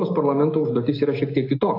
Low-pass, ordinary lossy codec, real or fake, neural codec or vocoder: 5.4 kHz; AAC, 32 kbps; real; none